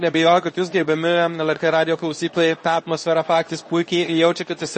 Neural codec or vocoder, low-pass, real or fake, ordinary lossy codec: codec, 24 kHz, 0.9 kbps, WavTokenizer, medium speech release version 2; 10.8 kHz; fake; MP3, 32 kbps